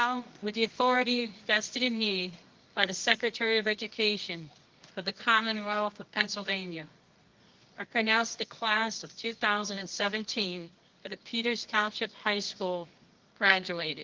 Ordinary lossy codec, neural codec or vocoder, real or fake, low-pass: Opus, 32 kbps; codec, 24 kHz, 0.9 kbps, WavTokenizer, medium music audio release; fake; 7.2 kHz